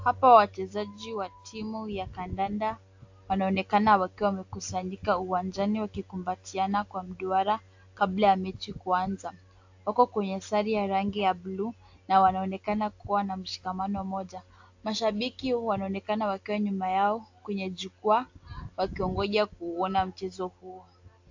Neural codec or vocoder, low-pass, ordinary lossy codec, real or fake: none; 7.2 kHz; AAC, 48 kbps; real